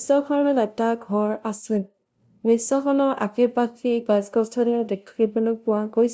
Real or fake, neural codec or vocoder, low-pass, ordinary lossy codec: fake; codec, 16 kHz, 0.5 kbps, FunCodec, trained on LibriTTS, 25 frames a second; none; none